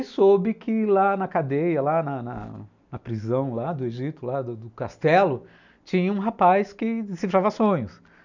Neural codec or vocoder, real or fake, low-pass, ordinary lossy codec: none; real; 7.2 kHz; none